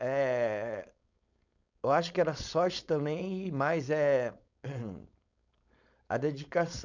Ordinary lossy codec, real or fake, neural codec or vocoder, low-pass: none; fake; codec, 16 kHz, 4.8 kbps, FACodec; 7.2 kHz